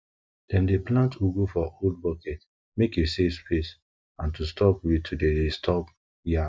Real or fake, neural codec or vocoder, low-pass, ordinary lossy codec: real; none; none; none